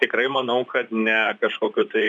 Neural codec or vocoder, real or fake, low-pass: vocoder, 44.1 kHz, 128 mel bands, Pupu-Vocoder; fake; 10.8 kHz